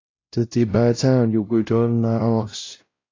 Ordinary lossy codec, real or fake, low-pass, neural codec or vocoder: AAC, 32 kbps; fake; 7.2 kHz; codec, 16 kHz, 0.5 kbps, X-Codec, WavLM features, trained on Multilingual LibriSpeech